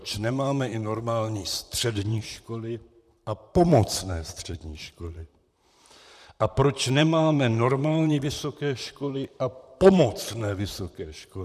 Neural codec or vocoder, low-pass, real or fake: vocoder, 44.1 kHz, 128 mel bands, Pupu-Vocoder; 14.4 kHz; fake